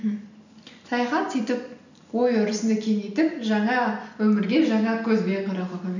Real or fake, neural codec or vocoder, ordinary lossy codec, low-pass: real; none; none; 7.2 kHz